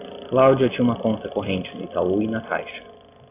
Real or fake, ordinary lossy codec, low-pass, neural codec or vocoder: real; AAC, 24 kbps; 3.6 kHz; none